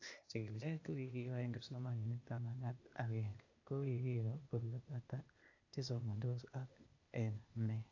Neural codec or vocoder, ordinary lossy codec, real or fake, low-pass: codec, 16 kHz, 0.8 kbps, ZipCodec; AAC, 48 kbps; fake; 7.2 kHz